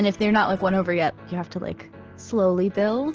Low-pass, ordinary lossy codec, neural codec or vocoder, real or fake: 7.2 kHz; Opus, 16 kbps; none; real